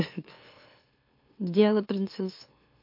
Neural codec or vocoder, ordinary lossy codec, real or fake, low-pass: autoencoder, 44.1 kHz, a latent of 192 numbers a frame, MeloTTS; MP3, 32 kbps; fake; 5.4 kHz